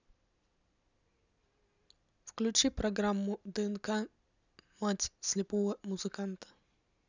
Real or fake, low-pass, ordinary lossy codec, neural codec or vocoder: real; 7.2 kHz; none; none